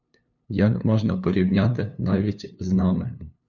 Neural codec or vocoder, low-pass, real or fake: codec, 16 kHz, 2 kbps, FunCodec, trained on LibriTTS, 25 frames a second; 7.2 kHz; fake